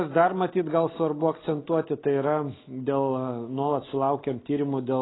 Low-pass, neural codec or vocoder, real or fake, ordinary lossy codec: 7.2 kHz; none; real; AAC, 16 kbps